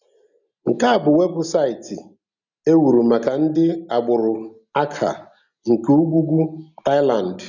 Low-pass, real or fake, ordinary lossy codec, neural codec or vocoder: 7.2 kHz; real; none; none